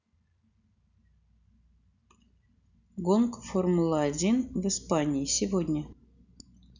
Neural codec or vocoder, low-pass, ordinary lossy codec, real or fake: none; 7.2 kHz; none; real